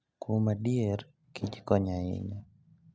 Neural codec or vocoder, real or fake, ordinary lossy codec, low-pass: none; real; none; none